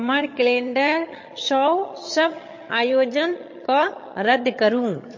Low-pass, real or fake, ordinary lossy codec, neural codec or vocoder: 7.2 kHz; fake; MP3, 32 kbps; vocoder, 22.05 kHz, 80 mel bands, HiFi-GAN